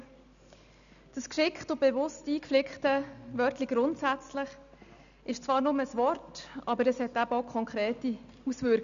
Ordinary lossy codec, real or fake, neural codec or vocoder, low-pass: none; real; none; 7.2 kHz